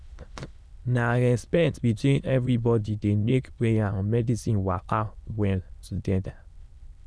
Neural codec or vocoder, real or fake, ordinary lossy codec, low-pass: autoencoder, 22.05 kHz, a latent of 192 numbers a frame, VITS, trained on many speakers; fake; none; none